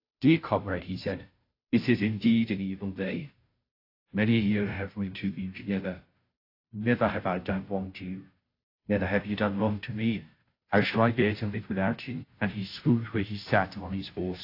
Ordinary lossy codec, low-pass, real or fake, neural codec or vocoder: AAC, 32 kbps; 5.4 kHz; fake; codec, 16 kHz, 0.5 kbps, FunCodec, trained on Chinese and English, 25 frames a second